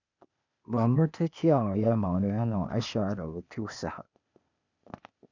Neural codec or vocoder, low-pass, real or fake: codec, 16 kHz, 0.8 kbps, ZipCodec; 7.2 kHz; fake